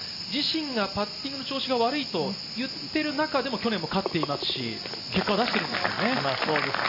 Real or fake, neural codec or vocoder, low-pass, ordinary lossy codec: real; none; 5.4 kHz; none